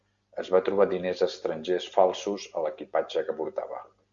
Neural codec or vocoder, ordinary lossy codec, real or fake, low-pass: none; Opus, 64 kbps; real; 7.2 kHz